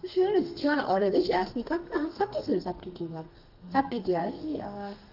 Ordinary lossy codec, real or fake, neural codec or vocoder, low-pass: Opus, 24 kbps; fake; codec, 32 kHz, 1.9 kbps, SNAC; 5.4 kHz